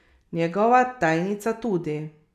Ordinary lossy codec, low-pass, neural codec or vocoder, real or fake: none; 14.4 kHz; none; real